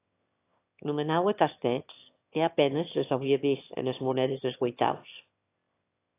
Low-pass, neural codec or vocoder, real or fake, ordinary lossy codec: 3.6 kHz; autoencoder, 22.05 kHz, a latent of 192 numbers a frame, VITS, trained on one speaker; fake; AAC, 24 kbps